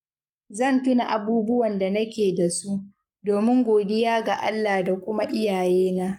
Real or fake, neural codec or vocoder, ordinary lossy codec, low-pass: fake; codec, 44.1 kHz, 7.8 kbps, Pupu-Codec; none; 19.8 kHz